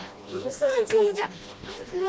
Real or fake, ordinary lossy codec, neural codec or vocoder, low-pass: fake; none; codec, 16 kHz, 1 kbps, FreqCodec, smaller model; none